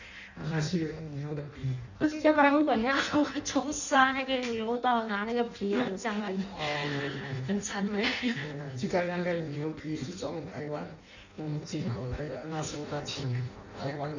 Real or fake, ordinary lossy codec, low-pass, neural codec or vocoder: fake; none; 7.2 kHz; codec, 16 kHz in and 24 kHz out, 0.6 kbps, FireRedTTS-2 codec